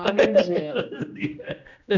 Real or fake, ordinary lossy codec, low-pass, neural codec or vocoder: fake; none; 7.2 kHz; codec, 16 kHz, 1 kbps, X-Codec, HuBERT features, trained on general audio